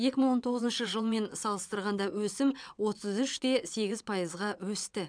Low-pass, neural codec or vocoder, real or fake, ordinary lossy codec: 9.9 kHz; vocoder, 22.05 kHz, 80 mel bands, WaveNeXt; fake; none